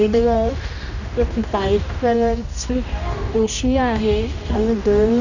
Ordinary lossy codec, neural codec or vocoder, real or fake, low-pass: none; codec, 16 kHz, 1 kbps, X-Codec, HuBERT features, trained on general audio; fake; 7.2 kHz